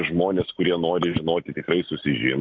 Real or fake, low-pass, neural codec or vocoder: real; 7.2 kHz; none